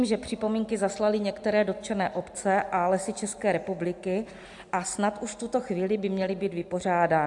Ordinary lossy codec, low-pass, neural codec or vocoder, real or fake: AAC, 64 kbps; 10.8 kHz; none; real